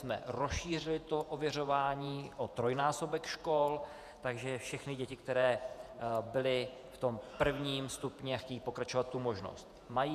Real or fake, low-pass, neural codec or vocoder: fake; 14.4 kHz; vocoder, 48 kHz, 128 mel bands, Vocos